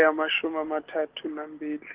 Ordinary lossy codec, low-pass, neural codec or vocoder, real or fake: Opus, 16 kbps; 3.6 kHz; none; real